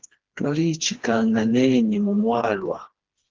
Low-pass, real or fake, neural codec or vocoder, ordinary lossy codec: 7.2 kHz; fake; codec, 16 kHz, 2 kbps, FreqCodec, smaller model; Opus, 16 kbps